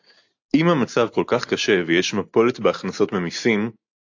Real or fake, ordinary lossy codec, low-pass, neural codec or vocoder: real; AAC, 48 kbps; 7.2 kHz; none